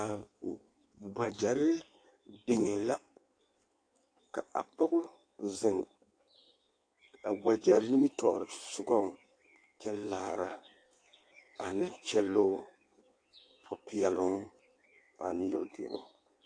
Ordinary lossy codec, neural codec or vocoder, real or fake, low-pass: AAC, 48 kbps; codec, 16 kHz in and 24 kHz out, 1.1 kbps, FireRedTTS-2 codec; fake; 9.9 kHz